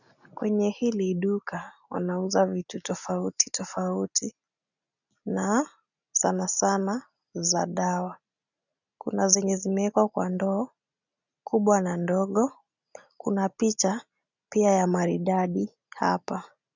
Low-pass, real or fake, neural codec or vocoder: 7.2 kHz; real; none